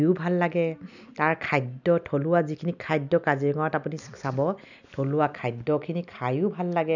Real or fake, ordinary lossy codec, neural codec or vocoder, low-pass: real; none; none; 7.2 kHz